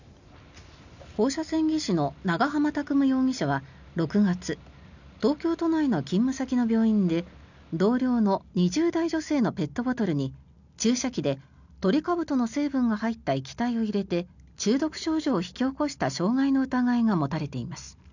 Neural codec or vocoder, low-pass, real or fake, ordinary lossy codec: none; 7.2 kHz; real; none